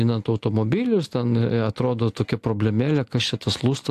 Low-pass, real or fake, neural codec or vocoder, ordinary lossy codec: 14.4 kHz; real; none; AAC, 64 kbps